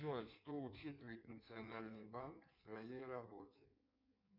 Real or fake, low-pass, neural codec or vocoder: fake; 5.4 kHz; codec, 16 kHz in and 24 kHz out, 1.1 kbps, FireRedTTS-2 codec